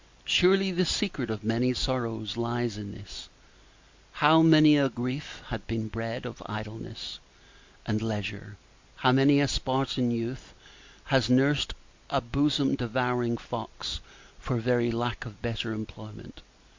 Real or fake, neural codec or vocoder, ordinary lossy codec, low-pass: real; none; MP3, 48 kbps; 7.2 kHz